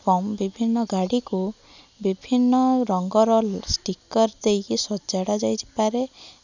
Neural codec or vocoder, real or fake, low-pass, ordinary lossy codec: none; real; 7.2 kHz; none